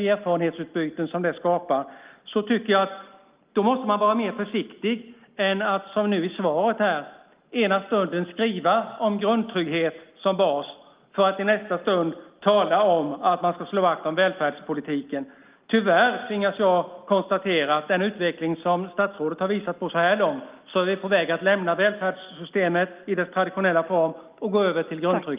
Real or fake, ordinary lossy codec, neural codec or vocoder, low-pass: real; Opus, 32 kbps; none; 3.6 kHz